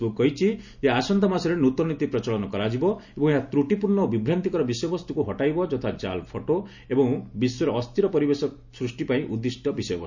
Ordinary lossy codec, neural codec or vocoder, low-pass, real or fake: none; none; 7.2 kHz; real